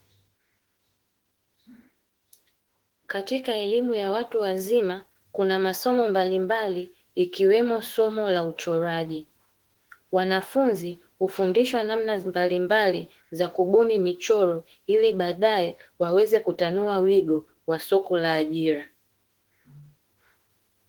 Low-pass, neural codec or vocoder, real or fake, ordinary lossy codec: 19.8 kHz; autoencoder, 48 kHz, 32 numbers a frame, DAC-VAE, trained on Japanese speech; fake; Opus, 16 kbps